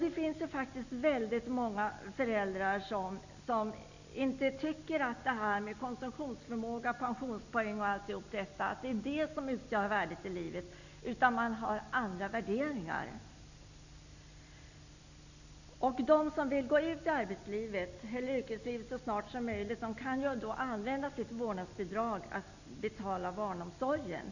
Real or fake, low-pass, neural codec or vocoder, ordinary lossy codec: real; 7.2 kHz; none; none